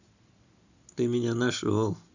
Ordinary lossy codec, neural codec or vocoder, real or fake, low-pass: none; none; real; 7.2 kHz